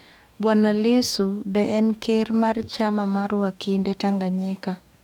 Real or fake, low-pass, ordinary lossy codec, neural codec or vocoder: fake; 19.8 kHz; none; codec, 44.1 kHz, 2.6 kbps, DAC